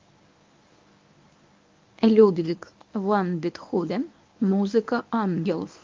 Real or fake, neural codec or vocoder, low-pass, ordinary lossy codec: fake; codec, 24 kHz, 0.9 kbps, WavTokenizer, medium speech release version 1; 7.2 kHz; Opus, 24 kbps